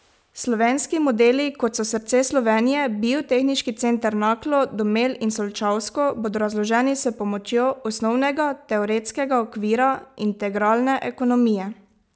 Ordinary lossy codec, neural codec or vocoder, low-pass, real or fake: none; none; none; real